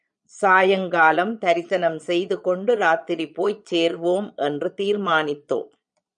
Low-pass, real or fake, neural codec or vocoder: 9.9 kHz; fake; vocoder, 22.05 kHz, 80 mel bands, Vocos